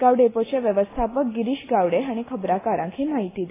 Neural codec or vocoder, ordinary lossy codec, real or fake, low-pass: none; AAC, 16 kbps; real; 3.6 kHz